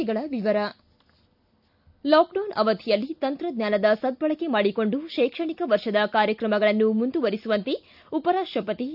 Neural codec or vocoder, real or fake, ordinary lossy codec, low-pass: none; real; none; 5.4 kHz